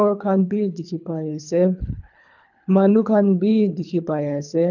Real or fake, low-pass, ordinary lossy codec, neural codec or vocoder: fake; 7.2 kHz; none; codec, 24 kHz, 3 kbps, HILCodec